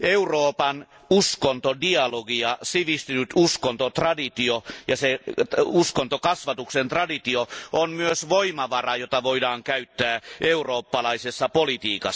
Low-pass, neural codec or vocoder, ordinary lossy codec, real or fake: none; none; none; real